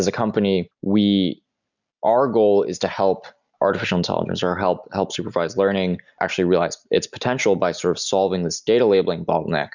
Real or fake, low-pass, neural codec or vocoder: real; 7.2 kHz; none